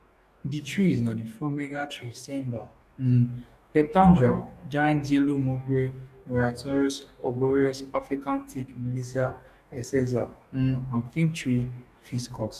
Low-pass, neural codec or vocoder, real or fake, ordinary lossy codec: 14.4 kHz; codec, 44.1 kHz, 2.6 kbps, DAC; fake; none